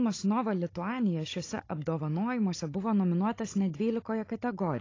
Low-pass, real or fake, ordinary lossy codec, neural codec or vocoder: 7.2 kHz; fake; AAC, 32 kbps; codec, 16 kHz, 16 kbps, FunCodec, trained on Chinese and English, 50 frames a second